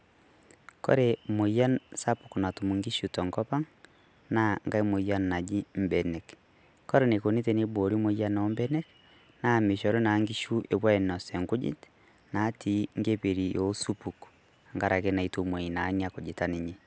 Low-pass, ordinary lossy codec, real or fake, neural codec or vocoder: none; none; real; none